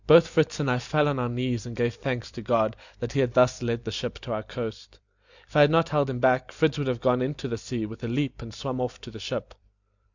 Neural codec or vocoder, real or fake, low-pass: vocoder, 44.1 kHz, 128 mel bands every 256 samples, BigVGAN v2; fake; 7.2 kHz